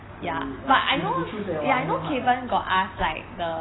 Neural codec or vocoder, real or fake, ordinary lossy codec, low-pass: none; real; AAC, 16 kbps; 7.2 kHz